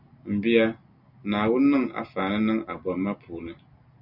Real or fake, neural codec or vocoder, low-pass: real; none; 5.4 kHz